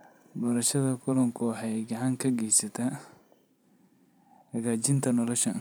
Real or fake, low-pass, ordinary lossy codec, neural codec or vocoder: fake; none; none; vocoder, 44.1 kHz, 128 mel bands every 512 samples, BigVGAN v2